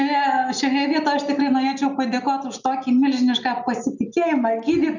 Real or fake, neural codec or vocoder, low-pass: real; none; 7.2 kHz